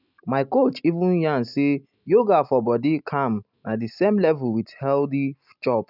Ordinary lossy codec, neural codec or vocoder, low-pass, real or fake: none; none; 5.4 kHz; real